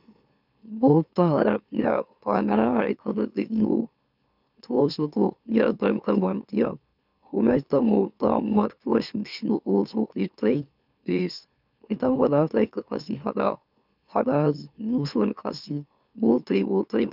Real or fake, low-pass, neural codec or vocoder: fake; 5.4 kHz; autoencoder, 44.1 kHz, a latent of 192 numbers a frame, MeloTTS